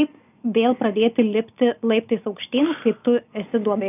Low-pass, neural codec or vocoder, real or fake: 3.6 kHz; none; real